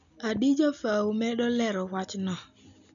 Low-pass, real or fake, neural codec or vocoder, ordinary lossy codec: 7.2 kHz; real; none; none